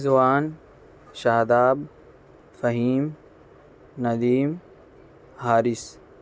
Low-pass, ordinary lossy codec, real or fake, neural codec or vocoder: none; none; real; none